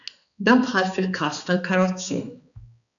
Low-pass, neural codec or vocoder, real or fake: 7.2 kHz; codec, 16 kHz, 2 kbps, X-Codec, HuBERT features, trained on balanced general audio; fake